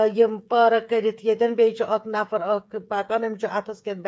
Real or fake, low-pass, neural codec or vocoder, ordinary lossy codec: fake; none; codec, 16 kHz, 8 kbps, FreqCodec, smaller model; none